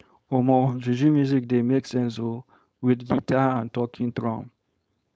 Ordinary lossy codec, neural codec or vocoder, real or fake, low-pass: none; codec, 16 kHz, 4.8 kbps, FACodec; fake; none